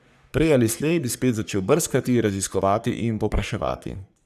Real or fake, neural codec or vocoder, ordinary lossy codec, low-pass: fake; codec, 44.1 kHz, 3.4 kbps, Pupu-Codec; none; 14.4 kHz